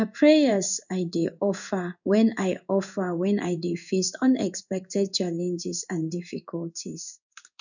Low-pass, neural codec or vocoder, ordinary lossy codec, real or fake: 7.2 kHz; codec, 16 kHz in and 24 kHz out, 1 kbps, XY-Tokenizer; none; fake